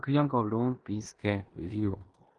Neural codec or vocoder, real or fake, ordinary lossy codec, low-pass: codec, 16 kHz in and 24 kHz out, 0.9 kbps, LongCat-Audio-Codec, four codebook decoder; fake; Opus, 24 kbps; 10.8 kHz